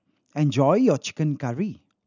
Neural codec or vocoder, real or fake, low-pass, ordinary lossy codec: none; real; 7.2 kHz; none